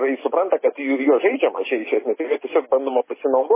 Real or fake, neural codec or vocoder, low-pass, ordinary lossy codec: real; none; 3.6 kHz; MP3, 16 kbps